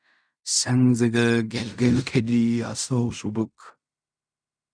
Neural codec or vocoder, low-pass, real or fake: codec, 16 kHz in and 24 kHz out, 0.4 kbps, LongCat-Audio-Codec, fine tuned four codebook decoder; 9.9 kHz; fake